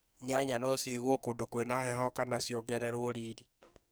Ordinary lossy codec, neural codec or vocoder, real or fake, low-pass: none; codec, 44.1 kHz, 2.6 kbps, SNAC; fake; none